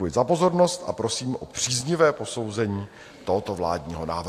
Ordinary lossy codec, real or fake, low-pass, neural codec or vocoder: AAC, 64 kbps; real; 14.4 kHz; none